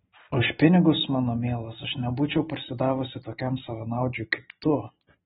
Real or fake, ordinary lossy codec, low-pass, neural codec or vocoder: real; AAC, 16 kbps; 19.8 kHz; none